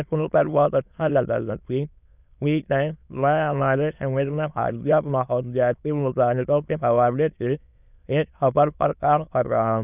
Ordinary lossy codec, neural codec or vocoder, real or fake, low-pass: none; autoencoder, 22.05 kHz, a latent of 192 numbers a frame, VITS, trained on many speakers; fake; 3.6 kHz